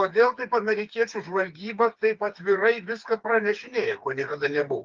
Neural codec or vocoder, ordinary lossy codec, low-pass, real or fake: codec, 16 kHz, 4 kbps, FreqCodec, smaller model; Opus, 32 kbps; 7.2 kHz; fake